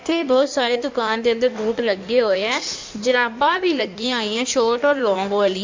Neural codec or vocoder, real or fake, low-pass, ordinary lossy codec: codec, 16 kHz in and 24 kHz out, 1.1 kbps, FireRedTTS-2 codec; fake; 7.2 kHz; MP3, 48 kbps